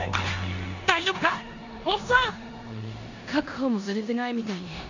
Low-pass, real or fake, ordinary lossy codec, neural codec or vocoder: 7.2 kHz; fake; none; codec, 16 kHz in and 24 kHz out, 0.9 kbps, LongCat-Audio-Codec, four codebook decoder